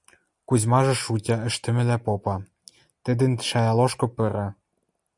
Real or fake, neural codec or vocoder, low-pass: real; none; 10.8 kHz